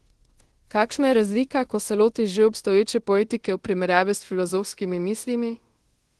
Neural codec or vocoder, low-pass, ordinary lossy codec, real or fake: codec, 24 kHz, 0.5 kbps, DualCodec; 10.8 kHz; Opus, 16 kbps; fake